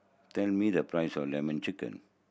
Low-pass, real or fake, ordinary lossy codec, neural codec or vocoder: none; real; none; none